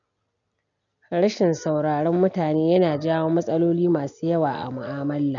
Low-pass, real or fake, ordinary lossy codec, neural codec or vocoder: 7.2 kHz; real; none; none